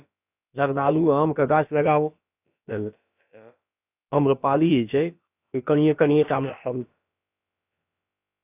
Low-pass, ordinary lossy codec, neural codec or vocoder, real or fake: 3.6 kHz; none; codec, 16 kHz, about 1 kbps, DyCAST, with the encoder's durations; fake